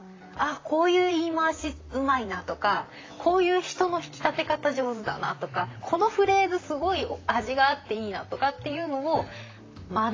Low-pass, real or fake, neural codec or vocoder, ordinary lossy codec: 7.2 kHz; fake; vocoder, 44.1 kHz, 128 mel bands, Pupu-Vocoder; AAC, 32 kbps